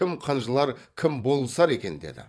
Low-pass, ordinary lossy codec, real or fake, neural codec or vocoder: none; none; fake; vocoder, 22.05 kHz, 80 mel bands, WaveNeXt